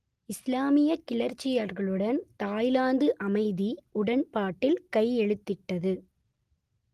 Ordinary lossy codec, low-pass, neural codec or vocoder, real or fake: Opus, 24 kbps; 14.4 kHz; none; real